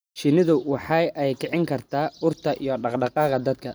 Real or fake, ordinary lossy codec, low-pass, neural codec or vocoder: real; none; none; none